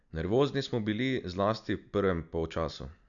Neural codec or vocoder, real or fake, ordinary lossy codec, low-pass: none; real; none; 7.2 kHz